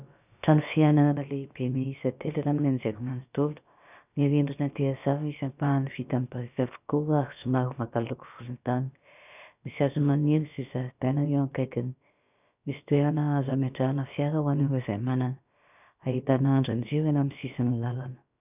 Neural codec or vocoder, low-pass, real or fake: codec, 16 kHz, about 1 kbps, DyCAST, with the encoder's durations; 3.6 kHz; fake